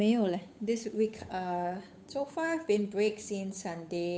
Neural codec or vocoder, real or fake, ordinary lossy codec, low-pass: codec, 16 kHz, 8 kbps, FunCodec, trained on Chinese and English, 25 frames a second; fake; none; none